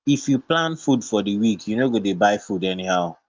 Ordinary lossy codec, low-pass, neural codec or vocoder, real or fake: Opus, 24 kbps; 7.2 kHz; none; real